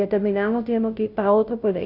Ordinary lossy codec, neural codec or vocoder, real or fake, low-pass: Opus, 64 kbps; codec, 16 kHz, 0.5 kbps, FunCodec, trained on Chinese and English, 25 frames a second; fake; 5.4 kHz